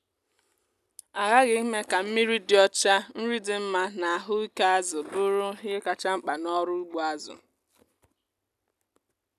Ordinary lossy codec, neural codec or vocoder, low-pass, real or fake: none; none; 14.4 kHz; real